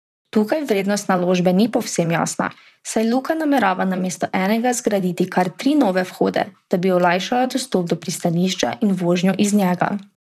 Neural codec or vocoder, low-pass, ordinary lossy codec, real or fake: vocoder, 44.1 kHz, 128 mel bands, Pupu-Vocoder; 14.4 kHz; none; fake